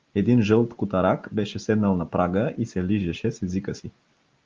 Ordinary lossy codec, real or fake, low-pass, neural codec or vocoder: Opus, 32 kbps; real; 7.2 kHz; none